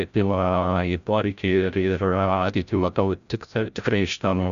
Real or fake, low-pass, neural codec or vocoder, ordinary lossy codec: fake; 7.2 kHz; codec, 16 kHz, 0.5 kbps, FreqCodec, larger model; Opus, 64 kbps